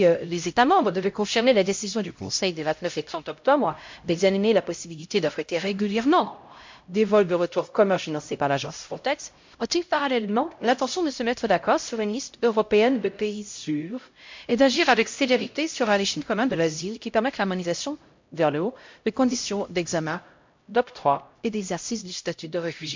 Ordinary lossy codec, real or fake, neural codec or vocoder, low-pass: MP3, 48 kbps; fake; codec, 16 kHz, 0.5 kbps, X-Codec, HuBERT features, trained on LibriSpeech; 7.2 kHz